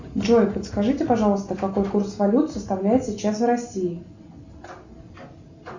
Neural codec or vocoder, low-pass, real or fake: none; 7.2 kHz; real